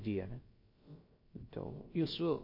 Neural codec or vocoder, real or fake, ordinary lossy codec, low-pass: codec, 16 kHz, about 1 kbps, DyCAST, with the encoder's durations; fake; MP3, 32 kbps; 5.4 kHz